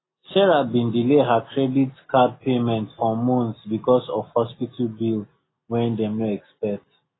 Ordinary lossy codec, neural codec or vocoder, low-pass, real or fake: AAC, 16 kbps; none; 7.2 kHz; real